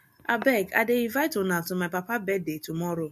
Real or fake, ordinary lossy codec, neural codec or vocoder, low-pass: real; MP3, 64 kbps; none; 14.4 kHz